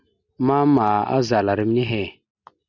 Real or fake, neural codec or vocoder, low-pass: real; none; 7.2 kHz